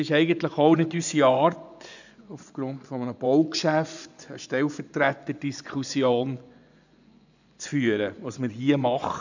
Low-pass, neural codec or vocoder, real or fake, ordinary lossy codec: 7.2 kHz; vocoder, 24 kHz, 100 mel bands, Vocos; fake; none